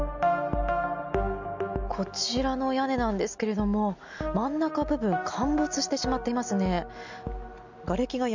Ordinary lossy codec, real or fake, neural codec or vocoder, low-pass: none; real; none; 7.2 kHz